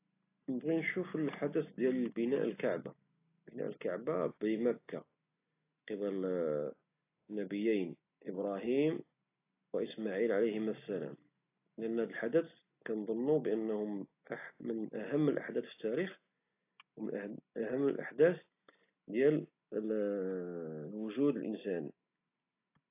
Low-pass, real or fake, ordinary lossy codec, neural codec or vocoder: 3.6 kHz; real; AAC, 24 kbps; none